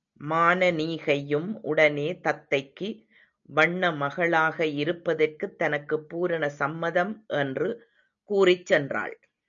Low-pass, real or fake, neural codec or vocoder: 7.2 kHz; real; none